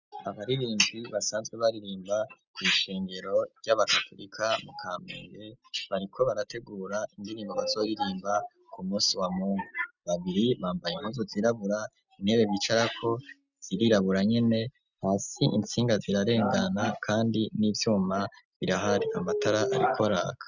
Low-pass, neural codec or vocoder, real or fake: 7.2 kHz; none; real